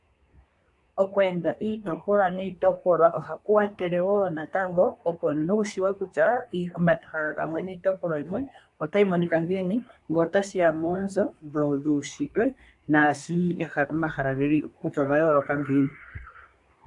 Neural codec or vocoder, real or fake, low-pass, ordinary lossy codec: codec, 24 kHz, 1 kbps, SNAC; fake; 10.8 kHz; AAC, 64 kbps